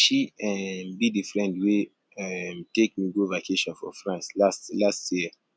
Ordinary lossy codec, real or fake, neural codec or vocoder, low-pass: none; real; none; none